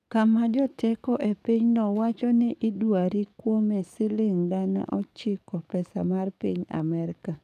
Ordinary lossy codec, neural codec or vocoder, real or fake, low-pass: none; codec, 44.1 kHz, 7.8 kbps, DAC; fake; 14.4 kHz